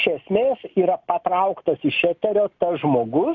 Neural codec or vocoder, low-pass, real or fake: none; 7.2 kHz; real